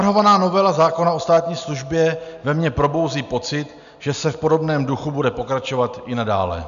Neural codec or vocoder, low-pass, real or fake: none; 7.2 kHz; real